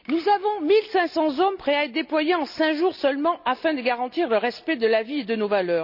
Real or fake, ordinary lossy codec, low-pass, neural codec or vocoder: real; none; 5.4 kHz; none